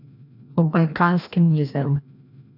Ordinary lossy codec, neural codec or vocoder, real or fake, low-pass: none; codec, 16 kHz, 1 kbps, FreqCodec, larger model; fake; 5.4 kHz